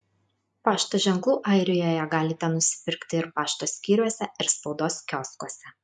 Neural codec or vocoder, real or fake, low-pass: none; real; 10.8 kHz